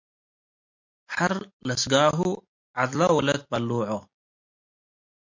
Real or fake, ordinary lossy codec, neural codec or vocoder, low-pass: real; MP3, 48 kbps; none; 7.2 kHz